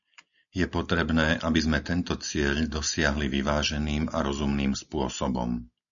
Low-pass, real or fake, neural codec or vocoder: 7.2 kHz; real; none